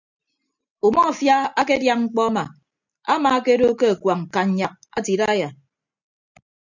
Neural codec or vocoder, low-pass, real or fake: none; 7.2 kHz; real